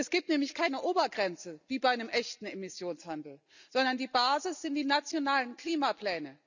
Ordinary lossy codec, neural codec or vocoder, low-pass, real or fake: none; none; 7.2 kHz; real